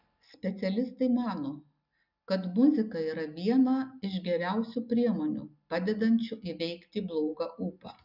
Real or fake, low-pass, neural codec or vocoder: real; 5.4 kHz; none